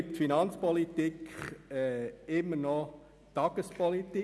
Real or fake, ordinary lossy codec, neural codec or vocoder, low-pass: real; none; none; none